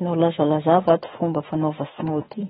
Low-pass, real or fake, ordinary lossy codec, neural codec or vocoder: 19.8 kHz; fake; AAC, 16 kbps; autoencoder, 48 kHz, 32 numbers a frame, DAC-VAE, trained on Japanese speech